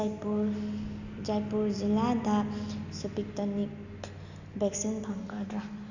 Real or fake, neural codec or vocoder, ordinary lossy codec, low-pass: real; none; none; 7.2 kHz